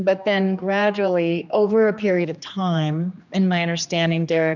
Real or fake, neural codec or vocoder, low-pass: fake; codec, 16 kHz, 2 kbps, X-Codec, HuBERT features, trained on general audio; 7.2 kHz